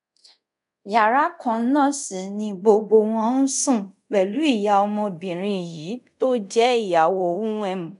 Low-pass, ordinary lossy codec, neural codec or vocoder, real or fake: 10.8 kHz; none; codec, 24 kHz, 0.5 kbps, DualCodec; fake